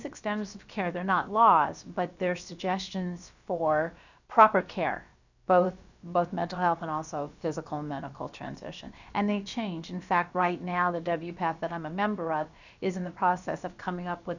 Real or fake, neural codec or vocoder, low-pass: fake; codec, 16 kHz, about 1 kbps, DyCAST, with the encoder's durations; 7.2 kHz